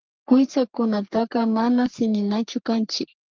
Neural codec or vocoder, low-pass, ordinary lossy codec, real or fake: codec, 44.1 kHz, 3.4 kbps, Pupu-Codec; 7.2 kHz; Opus, 32 kbps; fake